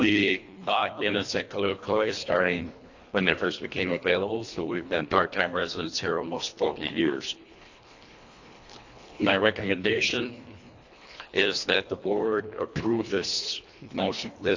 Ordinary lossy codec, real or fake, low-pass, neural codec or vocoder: AAC, 48 kbps; fake; 7.2 kHz; codec, 24 kHz, 1.5 kbps, HILCodec